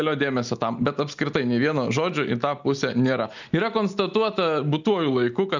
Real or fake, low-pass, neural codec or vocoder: real; 7.2 kHz; none